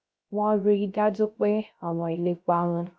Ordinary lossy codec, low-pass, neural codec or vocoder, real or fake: none; none; codec, 16 kHz, 0.3 kbps, FocalCodec; fake